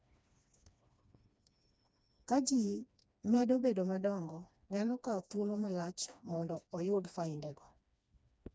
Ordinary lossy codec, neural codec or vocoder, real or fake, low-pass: none; codec, 16 kHz, 2 kbps, FreqCodec, smaller model; fake; none